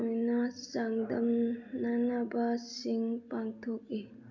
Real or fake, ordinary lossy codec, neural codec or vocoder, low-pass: real; none; none; 7.2 kHz